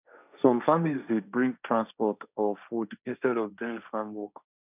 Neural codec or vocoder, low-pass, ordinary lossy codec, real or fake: codec, 16 kHz, 1.1 kbps, Voila-Tokenizer; 3.6 kHz; none; fake